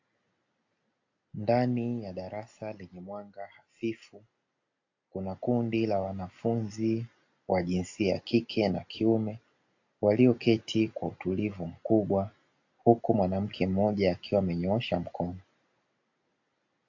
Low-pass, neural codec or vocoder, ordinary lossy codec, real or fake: 7.2 kHz; none; AAC, 48 kbps; real